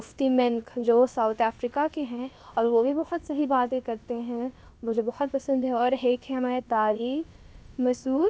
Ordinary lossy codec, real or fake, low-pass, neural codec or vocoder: none; fake; none; codec, 16 kHz, about 1 kbps, DyCAST, with the encoder's durations